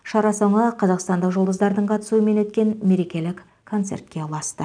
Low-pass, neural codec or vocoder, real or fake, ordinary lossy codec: 9.9 kHz; none; real; none